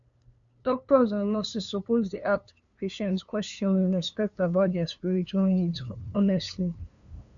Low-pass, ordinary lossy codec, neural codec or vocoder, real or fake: 7.2 kHz; none; codec, 16 kHz, 2 kbps, FunCodec, trained on LibriTTS, 25 frames a second; fake